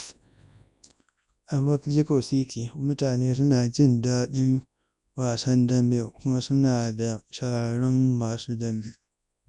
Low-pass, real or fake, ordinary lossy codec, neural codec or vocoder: 10.8 kHz; fake; none; codec, 24 kHz, 0.9 kbps, WavTokenizer, large speech release